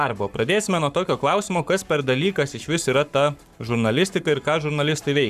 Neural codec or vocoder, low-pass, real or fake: codec, 44.1 kHz, 7.8 kbps, Pupu-Codec; 14.4 kHz; fake